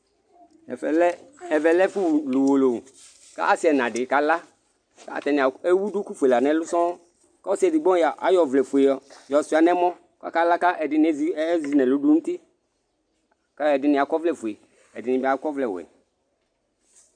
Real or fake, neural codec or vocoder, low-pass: real; none; 9.9 kHz